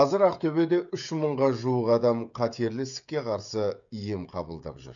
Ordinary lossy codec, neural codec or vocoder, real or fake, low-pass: none; codec, 16 kHz, 16 kbps, FreqCodec, smaller model; fake; 7.2 kHz